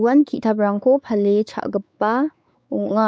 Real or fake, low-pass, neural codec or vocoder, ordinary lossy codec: fake; none; codec, 16 kHz, 4 kbps, X-Codec, WavLM features, trained on Multilingual LibriSpeech; none